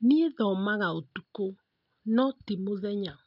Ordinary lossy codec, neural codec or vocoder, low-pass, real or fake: none; none; 5.4 kHz; real